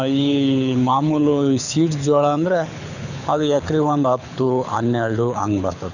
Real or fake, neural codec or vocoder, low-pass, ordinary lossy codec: fake; codec, 24 kHz, 6 kbps, HILCodec; 7.2 kHz; none